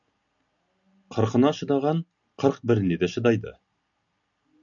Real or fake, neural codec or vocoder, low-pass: real; none; 7.2 kHz